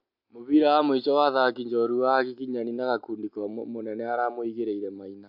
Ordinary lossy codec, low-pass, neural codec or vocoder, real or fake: AAC, 48 kbps; 5.4 kHz; none; real